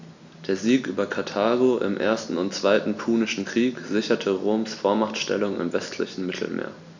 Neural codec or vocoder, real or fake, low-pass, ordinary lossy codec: none; real; 7.2 kHz; none